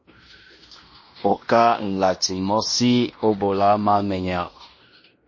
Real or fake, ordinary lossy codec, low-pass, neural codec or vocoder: fake; MP3, 32 kbps; 7.2 kHz; codec, 16 kHz in and 24 kHz out, 0.9 kbps, LongCat-Audio-Codec, fine tuned four codebook decoder